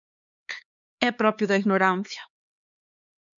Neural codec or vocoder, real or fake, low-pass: codec, 16 kHz, 4 kbps, X-Codec, HuBERT features, trained on LibriSpeech; fake; 7.2 kHz